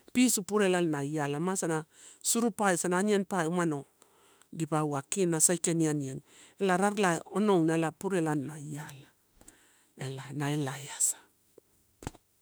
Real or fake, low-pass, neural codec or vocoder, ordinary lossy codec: fake; none; autoencoder, 48 kHz, 32 numbers a frame, DAC-VAE, trained on Japanese speech; none